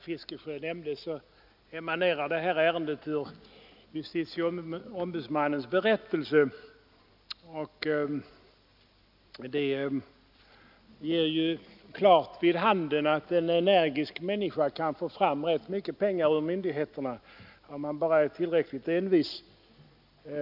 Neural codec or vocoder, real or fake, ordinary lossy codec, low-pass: none; real; none; 5.4 kHz